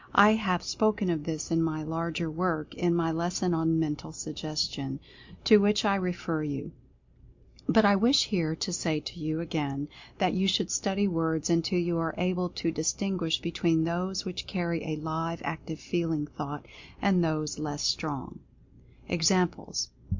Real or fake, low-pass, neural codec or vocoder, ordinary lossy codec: real; 7.2 kHz; none; MP3, 48 kbps